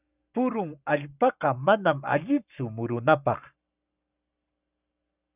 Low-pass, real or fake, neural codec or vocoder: 3.6 kHz; fake; codec, 44.1 kHz, 7.8 kbps, Pupu-Codec